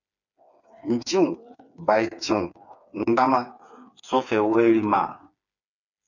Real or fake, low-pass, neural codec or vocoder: fake; 7.2 kHz; codec, 16 kHz, 4 kbps, FreqCodec, smaller model